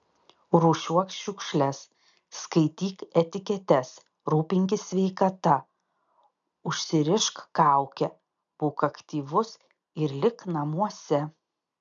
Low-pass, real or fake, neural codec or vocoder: 7.2 kHz; real; none